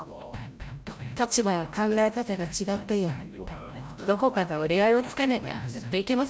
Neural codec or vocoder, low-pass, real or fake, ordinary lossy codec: codec, 16 kHz, 0.5 kbps, FreqCodec, larger model; none; fake; none